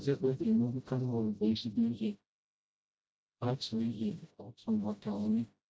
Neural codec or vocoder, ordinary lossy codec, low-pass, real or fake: codec, 16 kHz, 0.5 kbps, FreqCodec, smaller model; none; none; fake